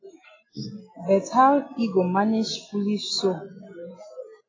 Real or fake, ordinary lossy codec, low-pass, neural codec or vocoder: real; AAC, 32 kbps; 7.2 kHz; none